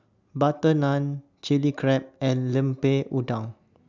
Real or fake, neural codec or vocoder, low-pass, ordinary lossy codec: real; none; 7.2 kHz; none